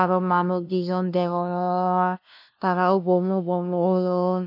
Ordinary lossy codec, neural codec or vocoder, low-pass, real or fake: none; codec, 16 kHz, 0.5 kbps, FunCodec, trained on LibriTTS, 25 frames a second; 5.4 kHz; fake